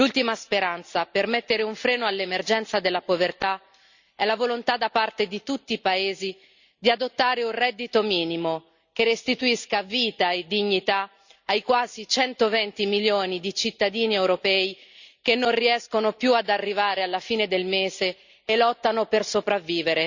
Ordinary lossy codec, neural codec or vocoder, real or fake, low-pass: Opus, 64 kbps; none; real; 7.2 kHz